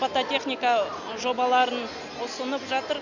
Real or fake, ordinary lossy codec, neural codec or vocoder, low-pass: real; none; none; 7.2 kHz